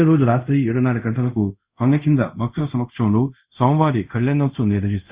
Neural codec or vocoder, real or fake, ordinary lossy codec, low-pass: codec, 24 kHz, 0.5 kbps, DualCodec; fake; Opus, 64 kbps; 3.6 kHz